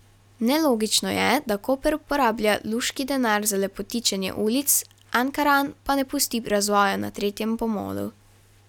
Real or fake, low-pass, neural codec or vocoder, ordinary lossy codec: real; 19.8 kHz; none; none